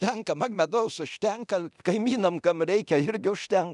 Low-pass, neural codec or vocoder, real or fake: 10.8 kHz; codec, 24 kHz, 0.9 kbps, DualCodec; fake